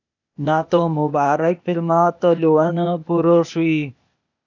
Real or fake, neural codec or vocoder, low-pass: fake; codec, 16 kHz, 0.8 kbps, ZipCodec; 7.2 kHz